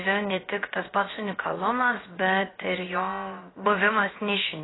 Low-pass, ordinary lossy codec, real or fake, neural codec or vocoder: 7.2 kHz; AAC, 16 kbps; fake; codec, 16 kHz, about 1 kbps, DyCAST, with the encoder's durations